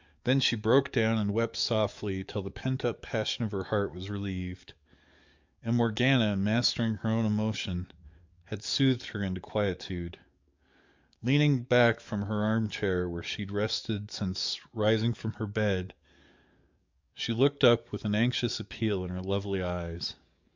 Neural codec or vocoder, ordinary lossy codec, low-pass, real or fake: codec, 44.1 kHz, 7.8 kbps, DAC; MP3, 64 kbps; 7.2 kHz; fake